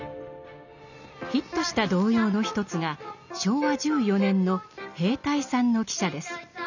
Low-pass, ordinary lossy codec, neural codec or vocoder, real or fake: 7.2 kHz; none; none; real